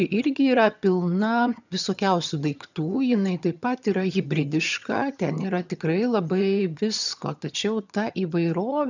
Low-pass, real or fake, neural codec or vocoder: 7.2 kHz; fake; vocoder, 22.05 kHz, 80 mel bands, HiFi-GAN